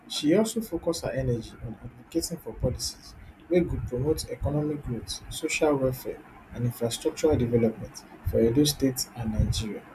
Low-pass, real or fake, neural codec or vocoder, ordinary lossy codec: 14.4 kHz; real; none; none